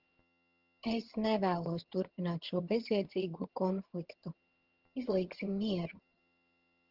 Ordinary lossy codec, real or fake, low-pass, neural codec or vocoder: Opus, 16 kbps; fake; 5.4 kHz; vocoder, 22.05 kHz, 80 mel bands, HiFi-GAN